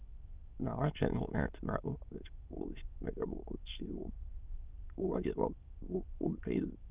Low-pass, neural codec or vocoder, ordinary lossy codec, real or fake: 3.6 kHz; autoencoder, 22.05 kHz, a latent of 192 numbers a frame, VITS, trained on many speakers; Opus, 24 kbps; fake